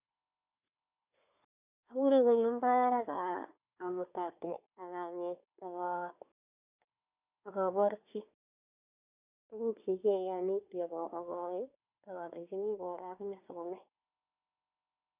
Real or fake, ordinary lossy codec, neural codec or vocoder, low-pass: fake; none; codec, 24 kHz, 1 kbps, SNAC; 3.6 kHz